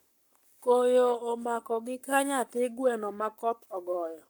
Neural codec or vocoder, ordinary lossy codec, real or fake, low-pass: codec, 44.1 kHz, 7.8 kbps, Pupu-Codec; none; fake; 19.8 kHz